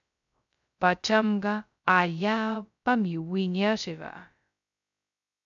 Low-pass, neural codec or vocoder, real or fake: 7.2 kHz; codec, 16 kHz, 0.2 kbps, FocalCodec; fake